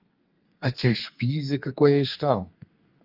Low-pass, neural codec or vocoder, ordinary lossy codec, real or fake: 5.4 kHz; codec, 32 kHz, 1.9 kbps, SNAC; Opus, 24 kbps; fake